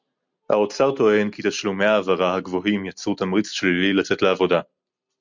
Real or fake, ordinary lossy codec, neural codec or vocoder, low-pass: real; MP3, 64 kbps; none; 7.2 kHz